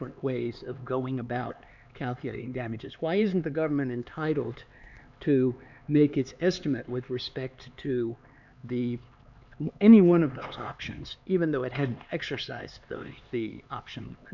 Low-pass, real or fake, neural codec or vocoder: 7.2 kHz; fake; codec, 16 kHz, 4 kbps, X-Codec, HuBERT features, trained on LibriSpeech